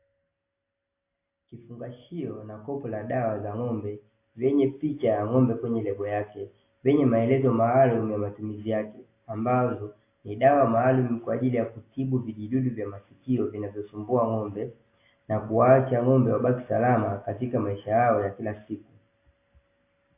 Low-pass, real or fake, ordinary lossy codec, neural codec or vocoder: 3.6 kHz; real; AAC, 24 kbps; none